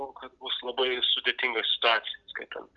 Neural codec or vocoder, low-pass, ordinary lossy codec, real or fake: none; 7.2 kHz; Opus, 32 kbps; real